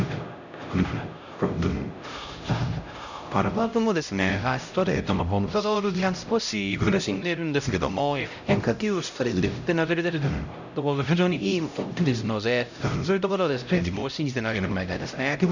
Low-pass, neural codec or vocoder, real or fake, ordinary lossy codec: 7.2 kHz; codec, 16 kHz, 0.5 kbps, X-Codec, HuBERT features, trained on LibriSpeech; fake; none